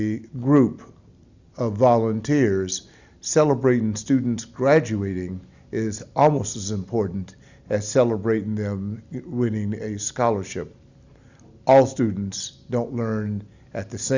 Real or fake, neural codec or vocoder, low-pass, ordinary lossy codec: real; none; 7.2 kHz; Opus, 64 kbps